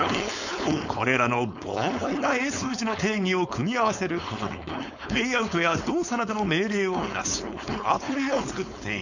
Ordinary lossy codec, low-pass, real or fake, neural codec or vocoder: none; 7.2 kHz; fake; codec, 16 kHz, 4.8 kbps, FACodec